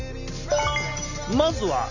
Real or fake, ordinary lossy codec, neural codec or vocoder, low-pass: real; none; none; 7.2 kHz